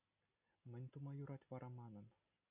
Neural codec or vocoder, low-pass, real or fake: none; 3.6 kHz; real